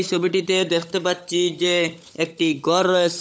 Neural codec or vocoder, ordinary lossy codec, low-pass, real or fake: codec, 16 kHz, 16 kbps, FunCodec, trained on LibriTTS, 50 frames a second; none; none; fake